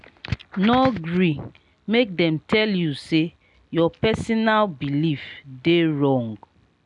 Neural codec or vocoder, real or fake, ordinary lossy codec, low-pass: none; real; none; 10.8 kHz